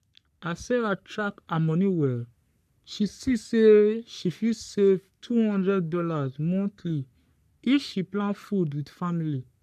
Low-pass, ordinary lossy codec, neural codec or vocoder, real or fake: 14.4 kHz; none; codec, 44.1 kHz, 3.4 kbps, Pupu-Codec; fake